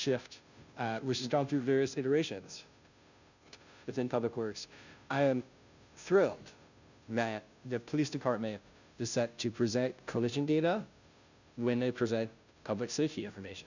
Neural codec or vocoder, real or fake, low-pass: codec, 16 kHz, 0.5 kbps, FunCodec, trained on Chinese and English, 25 frames a second; fake; 7.2 kHz